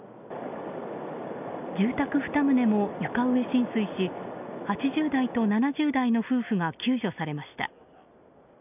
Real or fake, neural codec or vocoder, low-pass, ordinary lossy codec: real; none; 3.6 kHz; none